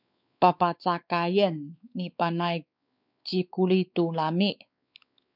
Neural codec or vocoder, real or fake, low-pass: codec, 16 kHz, 4 kbps, X-Codec, WavLM features, trained on Multilingual LibriSpeech; fake; 5.4 kHz